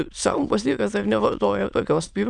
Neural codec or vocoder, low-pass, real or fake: autoencoder, 22.05 kHz, a latent of 192 numbers a frame, VITS, trained on many speakers; 9.9 kHz; fake